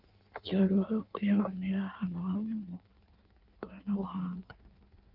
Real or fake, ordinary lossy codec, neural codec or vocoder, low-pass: fake; Opus, 24 kbps; codec, 16 kHz in and 24 kHz out, 1.1 kbps, FireRedTTS-2 codec; 5.4 kHz